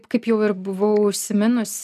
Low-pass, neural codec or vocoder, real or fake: 14.4 kHz; none; real